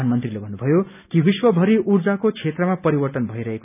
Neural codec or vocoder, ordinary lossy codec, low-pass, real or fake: none; none; 3.6 kHz; real